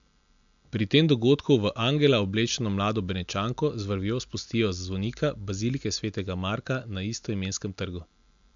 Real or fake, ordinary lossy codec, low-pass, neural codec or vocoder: real; MP3, 64 kbps; 7.2 kHz; none